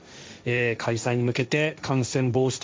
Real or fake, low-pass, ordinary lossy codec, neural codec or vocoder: fake; none; none; codec, 16 kHz, 1.1 kbps, Voila-Tokenizer